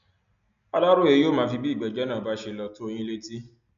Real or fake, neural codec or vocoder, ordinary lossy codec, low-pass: real; none; none; 7.2 kHz